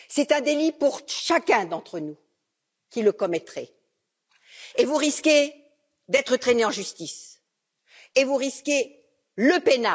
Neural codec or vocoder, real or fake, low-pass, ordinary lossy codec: none; real; none; none